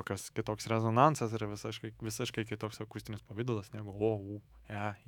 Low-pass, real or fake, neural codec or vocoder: 19.8 kHz; fake; autoencoder, 48 kHz, 128 numbers a frame, DAC-VAE, trained on Japanese speech